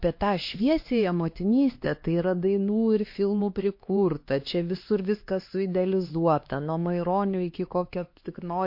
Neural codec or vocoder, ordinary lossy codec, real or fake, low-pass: codec, 16 kHz, 2 kbps, X-Codec, HuBERT features, trained on LibriSpeech; MP3, 32 kbps; fake; 5.4 kHz